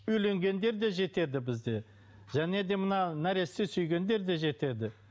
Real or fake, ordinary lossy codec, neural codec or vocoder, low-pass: real; none; none; none